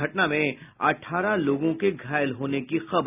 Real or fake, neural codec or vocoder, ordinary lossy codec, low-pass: real; none; none; 3.6 kHz